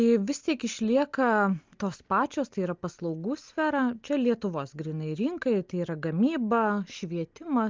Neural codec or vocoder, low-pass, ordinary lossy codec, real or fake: none; 7.2 kHz; Opus, 32 kbps; real